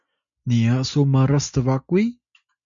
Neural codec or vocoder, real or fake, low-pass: none; real; 7.2 kHz